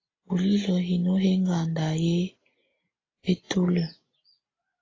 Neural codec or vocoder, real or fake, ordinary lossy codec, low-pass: none; real; AAC, 32 kbps; 7.2 kHz